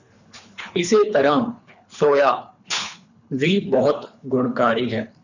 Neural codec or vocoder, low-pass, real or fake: codec, 24 kHz, 3 kbps, HILCodec; 7.2 kHz; fake